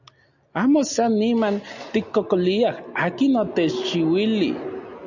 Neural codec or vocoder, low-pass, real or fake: none; 7.2 kHz; real